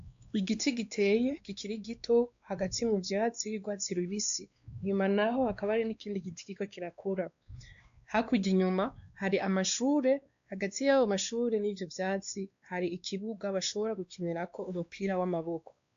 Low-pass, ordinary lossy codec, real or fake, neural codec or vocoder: 7.2 kHz; AAC, 64 kbps; fake; codec, 16 kHz, 2 kbps, X-Codec, WavLM features, trained on Multilingual LibriSpeech